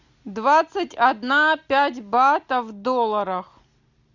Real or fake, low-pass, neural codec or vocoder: real; 7.2 kHz; none